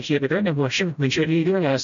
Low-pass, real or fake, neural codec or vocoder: 7.2 kHz; fake; codec, 16 kHz, 0.5 kbps, FreqCodec, smaller model